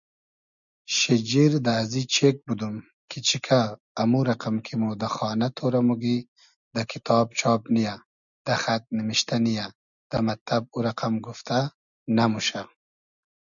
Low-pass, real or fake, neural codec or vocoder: 7.2 kHz; real; none